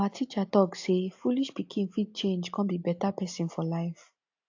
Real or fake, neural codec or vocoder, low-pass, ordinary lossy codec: real; none; 7.2 kHz; AAC, 48 kbps